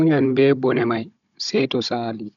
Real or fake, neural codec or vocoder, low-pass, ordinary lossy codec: fake; codec, 16 kHz, 16 kbps, FunCodec, trained on Chinese and English, 50 frames a second; 7.2 kHz; none